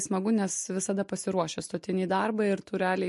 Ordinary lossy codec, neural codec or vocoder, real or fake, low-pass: MP3, 48 kbps; none; real; 14.4 kHz